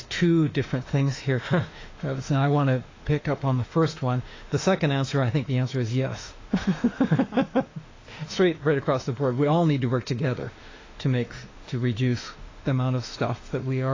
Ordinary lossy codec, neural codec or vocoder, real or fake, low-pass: AAC, 32 kbps; autoencoder, 48 kHz, 32 numbers a frame, DAC-VAE, trained on Japanese speech; fake; 7.2 kHz